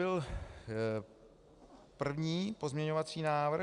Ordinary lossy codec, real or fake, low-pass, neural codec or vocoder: Opus, 64 kbps; real; 10.8 kHz; none